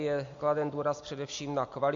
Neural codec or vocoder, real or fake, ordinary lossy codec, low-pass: none; real; MP3, 48 kbps; 7.2 kHz